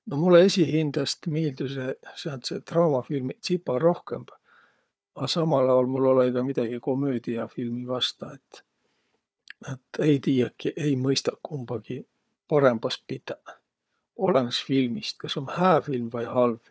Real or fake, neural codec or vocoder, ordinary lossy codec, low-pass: fake; codec, 16 kHz, 4 kbps, FunCodec, trained on Chinese and English, 50 frames a second; none; none